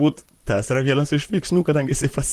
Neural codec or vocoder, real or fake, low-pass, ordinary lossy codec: vocoder, 44.1 kHz, 128 mel bands, Pupu-Vocoder; fake; 14.4 kHz; Opus, 24 kbps